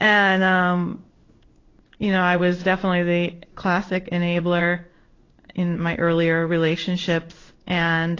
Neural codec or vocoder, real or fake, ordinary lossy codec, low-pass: codec, 16 kHz in and 24 kHz out, 1 kbps, XY-Tokenizer; fake; AAC, 32 kbps; 7.2 kHz